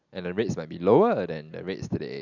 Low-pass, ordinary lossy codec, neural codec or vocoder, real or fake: 7.2 kHz; none; none; real